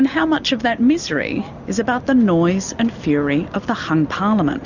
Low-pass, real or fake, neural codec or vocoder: 7.2 kHz; real; none